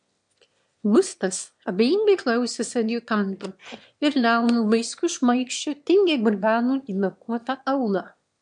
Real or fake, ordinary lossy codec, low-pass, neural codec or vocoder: fake; MP3, 64 kbps; 9.9 kHz; autoencoder, 22.05 kHz, a latent of 192 numbers a frame, VITS, trained on one speaker